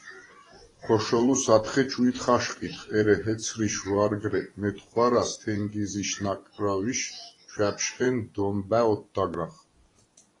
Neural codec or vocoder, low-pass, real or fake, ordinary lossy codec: vocoder, 24 kHz, 100 mel bands, Vocos; 10.8 kHz; fake; AAC, 32 kbps